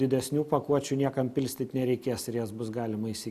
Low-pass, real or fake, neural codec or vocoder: 14.4 kHz; real; none